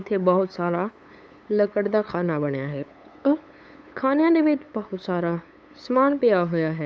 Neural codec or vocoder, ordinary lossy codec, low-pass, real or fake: codec, 16 kHz, 8 kbps, FunCodec, trained on LibriTTS, 25 frames a second; none; none; fake